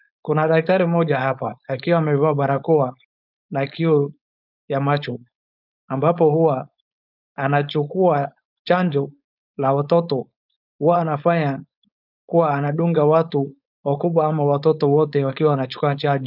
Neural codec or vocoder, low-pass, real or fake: codec, 16 kHz, 4.8 kbps, FACodec; 5.4 kHz; fake